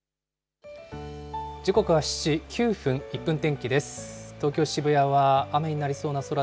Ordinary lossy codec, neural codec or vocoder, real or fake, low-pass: none; none; real; none